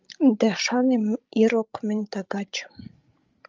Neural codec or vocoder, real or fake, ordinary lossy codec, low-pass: codec, 16 kHz, 16 kbps, FreqCodec, larger model; fake; Opus, 32 kbps; 7.2 kHz